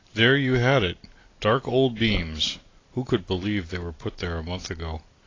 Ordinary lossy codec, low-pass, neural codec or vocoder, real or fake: AAC, 32 kbps; 7.2 kHz; none; real